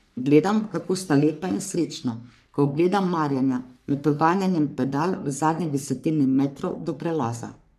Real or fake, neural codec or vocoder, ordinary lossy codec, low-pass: fake; codec, 44.1 kHz, 3.4 kbps, Pupu-Codec; none; 14.4 kHz